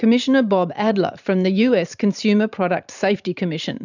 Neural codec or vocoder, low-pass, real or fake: vocoder, 44.1 kHz, 128 mel bands every 512 samples, BigVGAN v2; 7.2 kHz; fake